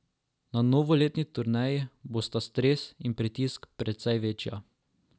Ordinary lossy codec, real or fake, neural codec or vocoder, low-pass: none; real; none; none